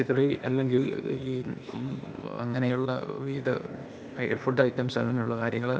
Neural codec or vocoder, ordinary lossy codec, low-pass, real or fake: codec, 16 kHz, 0.8 kbps, ZipCodec; none; none; fake